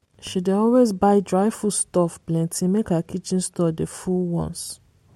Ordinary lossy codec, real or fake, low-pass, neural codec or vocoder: MP3, 64 kbps; real; 19.8 kHz; none